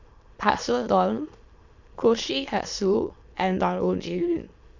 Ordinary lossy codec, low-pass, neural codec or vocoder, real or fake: Opus, 64 kbps; 7.2 kHz; autoencoder, 22.05 kHz, a latent of 192 numbers a frame, VITS, trained on many speakers; fake